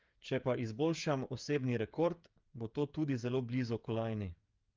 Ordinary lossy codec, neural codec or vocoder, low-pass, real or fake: Opus, 24 kbps; codec, 16 kHz, 8 kbps, FreqCodec, smaller model; 7.2 kHz; fake